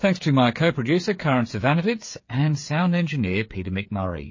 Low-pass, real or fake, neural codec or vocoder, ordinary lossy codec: 7.2 kHz; fake; codec, 16 kHz, 8 kbps, FreqCodec, smaller model; MP3, 32 kbps